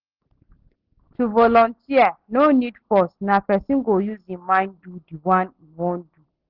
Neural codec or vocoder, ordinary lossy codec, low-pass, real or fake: none; Opus, 16 kbps; 5.4 kHz; real